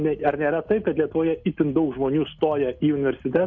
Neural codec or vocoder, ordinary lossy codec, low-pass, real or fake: none; MP3, 48 kbps; 7.2 kHz; real